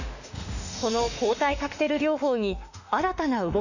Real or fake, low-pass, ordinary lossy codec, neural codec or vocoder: fake; 7.2 kHz; none; autoencoder, 48 kHz, 32 numbers a frame, DAC-VAE, trained on Japanese speech